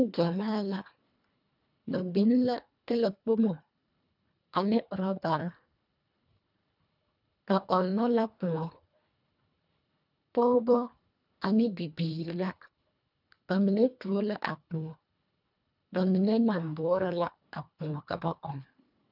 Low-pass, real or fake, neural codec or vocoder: 5.4 kHz; fake; codec, 24 kHz, 1.5 kbps, HILCodec